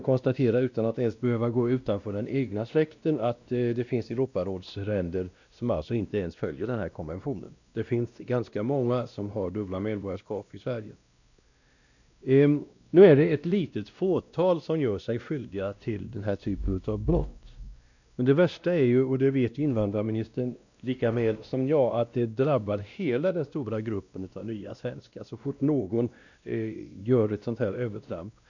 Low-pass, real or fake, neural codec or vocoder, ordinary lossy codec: 7.2 kHz; fake; codec, 16 kHz, 1 kbps, X-Codec, WavLM features, trained on Multilingual LibriSpeech; none